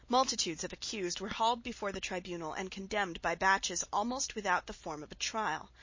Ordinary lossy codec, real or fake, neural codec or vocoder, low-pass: MP3, 32 kbps; real; none; 7.2 kHz